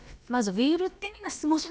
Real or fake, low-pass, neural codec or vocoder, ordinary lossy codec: fake; none; codec, 16 kHz, about 1 kbps, DyCAST, with the encoder's durations; none